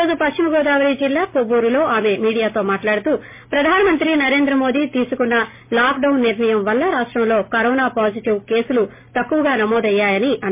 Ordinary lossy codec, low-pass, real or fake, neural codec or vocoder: MP3, 24 kbps; 3.6 kHz; real; none